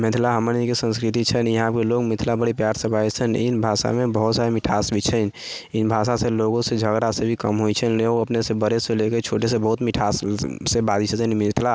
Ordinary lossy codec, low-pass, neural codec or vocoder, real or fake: none; none; none; real